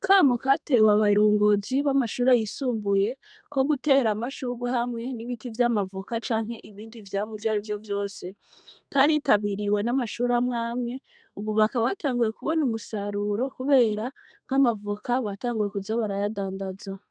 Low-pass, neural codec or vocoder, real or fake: 9.9 kHz; codec, 32 kHz, 1.9 kbps, SNAC; fake